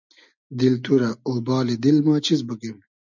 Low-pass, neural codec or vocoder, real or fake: 7.2 kHz; none; real